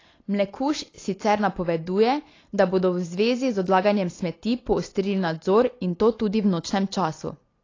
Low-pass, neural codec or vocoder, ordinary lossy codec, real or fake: 7.2 kHz; none; AAC, 32 kbps; real